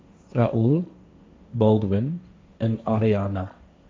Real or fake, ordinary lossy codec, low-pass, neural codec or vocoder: fake; none; none; codec, 16 kHz, 1.1 kbps, Voila-Tokenizer